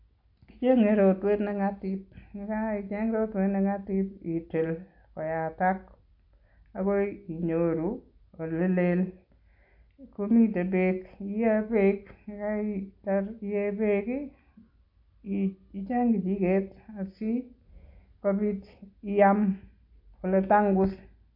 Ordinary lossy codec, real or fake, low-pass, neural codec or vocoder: AAC, 48 kbps; real; 5.4 kHz; none